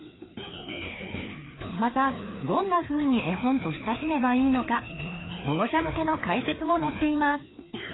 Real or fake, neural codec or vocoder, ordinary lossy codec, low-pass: fake; codec, 16 kHz, 2 kbps, FreqCodec, larger model; AAC, 16 kbps; 7.2 kHz